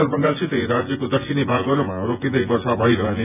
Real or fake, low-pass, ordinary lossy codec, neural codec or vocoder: fake; 3.6 kHz; none; vocoder, 24 kHz, 100 mel bands, Vocos